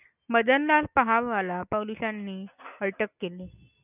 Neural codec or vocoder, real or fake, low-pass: none; real; 3.6 kHz